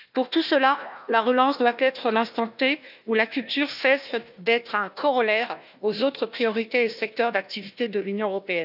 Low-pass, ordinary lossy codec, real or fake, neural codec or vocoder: 5.4 kHz; none; fake; codec, 16 kHz, 1 kbps, FunCodec, trained on Chinese and English, 50 frames a second